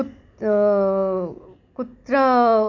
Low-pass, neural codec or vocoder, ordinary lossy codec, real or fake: 7.2 kHz; none; none; real